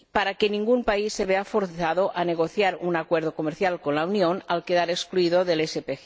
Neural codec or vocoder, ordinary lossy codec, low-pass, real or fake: none; none; none; real